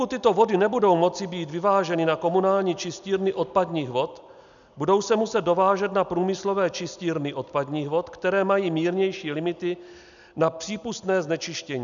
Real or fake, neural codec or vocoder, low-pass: real; none; 7.2 kHz